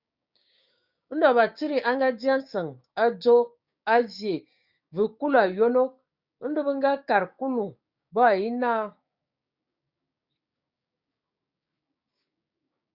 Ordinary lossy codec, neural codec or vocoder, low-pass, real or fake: Opus, 64 kbps; codec, 16 kHz, 6 kbps, DAC; 5.4 kHz; fake